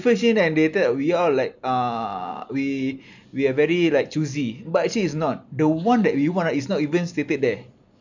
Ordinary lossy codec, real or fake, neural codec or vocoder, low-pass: none; real; none; 7.2 kHz